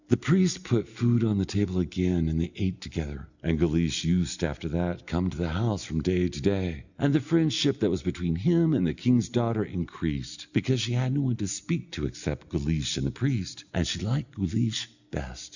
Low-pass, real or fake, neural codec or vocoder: 7.2 kHz; real; none